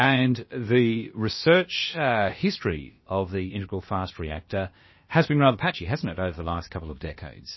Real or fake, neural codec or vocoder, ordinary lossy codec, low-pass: fake; codec, 16 kHz, about 1 kbps, DyCAST, with the encoder's durations; MP3, 24 kbps; 7.2 kHz